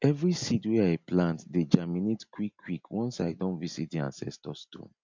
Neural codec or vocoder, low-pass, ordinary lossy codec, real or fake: none; 7.2 kHz; MP3, 64 kbps; real